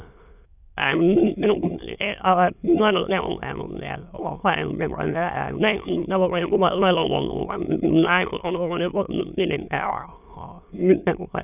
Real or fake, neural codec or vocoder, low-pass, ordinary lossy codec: fake; autoencoder, 22.05 kHz, a latent of 192 numbers a frame, VITS, trained on many speakers; 3.6 kHz; none